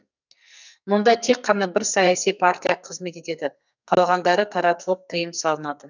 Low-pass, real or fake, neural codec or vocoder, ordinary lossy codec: 7.2 kHz; fake; codec, 44.1 kHz, 2.6 kbps, SNAC; none